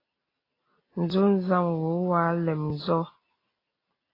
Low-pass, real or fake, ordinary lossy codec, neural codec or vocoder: 5.4 kHz; real; AAC, 24 kbps; none